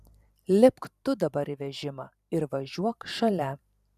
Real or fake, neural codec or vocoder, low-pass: fake; vocoder, 44.1 kHz, 128 mel bands every 512 samples, BigVGAN v2; 14.4 kHz